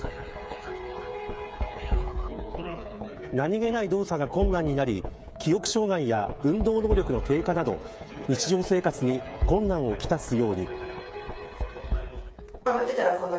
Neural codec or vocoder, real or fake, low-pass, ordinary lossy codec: codec, 16 kHz, 8 kbps, FreqCodec, smaller model; fake; none; none